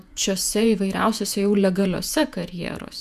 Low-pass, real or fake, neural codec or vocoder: 14.4 kHz; fake; vocoder, 48 kHz, 128 mel bands, Vocos